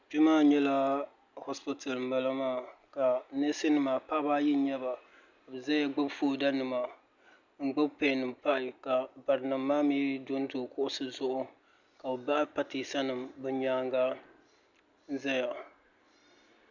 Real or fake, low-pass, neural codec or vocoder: real; 7.2 kHz; none